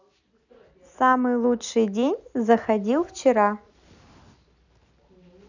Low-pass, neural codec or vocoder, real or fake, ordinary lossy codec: 7.2 kHz; none; real; none